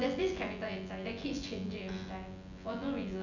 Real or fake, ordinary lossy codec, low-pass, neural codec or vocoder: fake; none; 7.2 kHz; vocoder, 24 kHz, 100 mel bands, Vocos